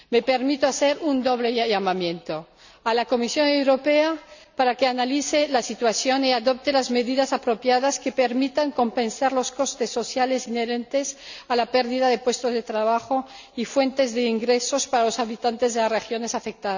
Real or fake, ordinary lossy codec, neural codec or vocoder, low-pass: real; none; none; 7.2 kHz